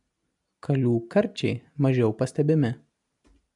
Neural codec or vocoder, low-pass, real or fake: none; 10.8 kHz; real